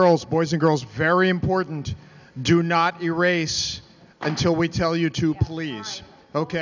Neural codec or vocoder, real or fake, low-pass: none; real; 7.2 kHz